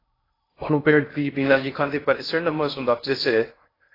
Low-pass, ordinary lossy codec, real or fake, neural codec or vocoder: 5.4 kHz; AAC, 24 kbps; fake; codec, 16 kHz in and 24 kHz out, 0.6 kbps, FocalCodec, streaming, 2048 codes